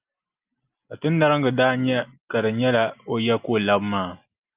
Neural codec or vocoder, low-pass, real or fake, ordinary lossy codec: none; 3.6 kHz; real; Opus, 24 kbps